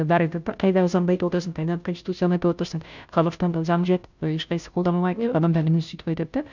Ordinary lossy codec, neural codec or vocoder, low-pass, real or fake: none; codec, 16 kHz, 0.5 kbps, FunCodec, trained on Chinese and English, 25 frames a second; 7.2 kHz; fake